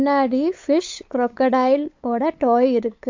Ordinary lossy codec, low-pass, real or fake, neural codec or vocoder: MP3, 48 kbps; 7.2 kHz; fake; codec, 16 kHz, 8 kbps, FunCodec, trained on LibriTTS, 25 frames a second